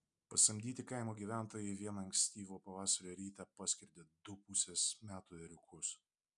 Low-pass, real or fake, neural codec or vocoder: 10.8 kHz; real; none